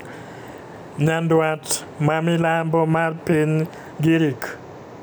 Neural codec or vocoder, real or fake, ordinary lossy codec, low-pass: none; real; none; none